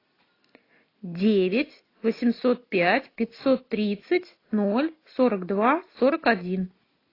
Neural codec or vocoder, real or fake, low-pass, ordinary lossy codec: none; real; 5.4 kHz; AAC, 24 kbps